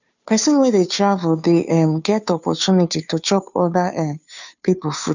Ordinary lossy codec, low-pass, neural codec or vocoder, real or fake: none; 7.2 kHz; codec, 16 kHz, 2 kbps, FunCodec, trained on Chinese and English, 25 frames a second; fake